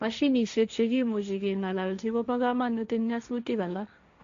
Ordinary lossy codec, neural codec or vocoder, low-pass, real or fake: MP3, 64 kbps; codec, 16 kHz, 1.1 kbps, Voila-Tokenizer; 7.2 kHz; fake